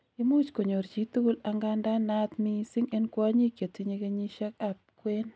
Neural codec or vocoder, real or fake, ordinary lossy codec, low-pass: none; real; none; none